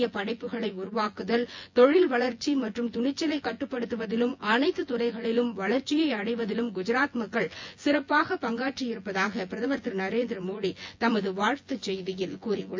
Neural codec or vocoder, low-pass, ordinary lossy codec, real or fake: vocoder, 24 kHz, 100 mel bands, Vocos; 7.2 kHz; MP3, 48 kbps; fake